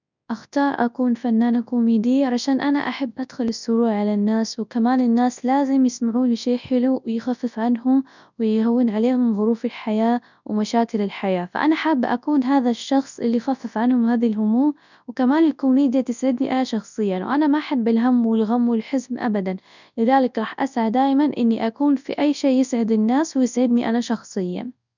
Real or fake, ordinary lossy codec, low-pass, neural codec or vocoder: fake; none; 7.2 kHz; codec, 24 kHz, 0.9 kbps, WavTokenizer, large speech release